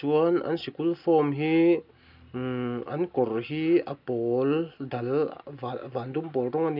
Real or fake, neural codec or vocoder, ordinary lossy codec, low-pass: real; none; none; 5.4 kHz